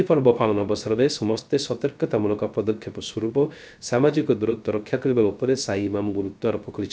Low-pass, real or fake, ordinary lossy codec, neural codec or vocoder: none; fake; none; codec, 16 kHz, 0.3 kbps, FocalCodec